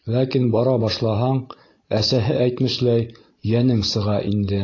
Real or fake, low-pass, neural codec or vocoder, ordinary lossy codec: real; 7.2 kHz; none; AAC, 32 kbps